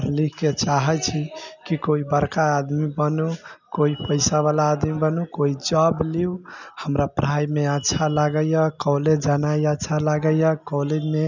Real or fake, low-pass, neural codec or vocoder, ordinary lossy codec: real; 7.2 kHz; none; none